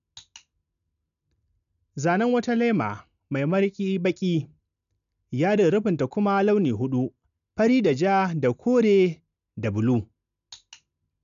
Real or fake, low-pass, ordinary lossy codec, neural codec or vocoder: real; 7.2 kHz; none; none